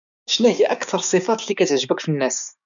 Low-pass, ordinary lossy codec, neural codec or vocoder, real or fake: 7.2 kHz; none; codec, 16 kHz, 6 kbps, DAC; fake